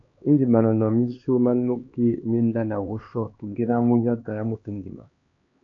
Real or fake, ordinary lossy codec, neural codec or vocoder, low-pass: fake; AAC, 48 kbps; codec, 16 kHz, 2 kbps, X-Codec, HuBERT features, trained on LibriSpeech; 7.2 kHz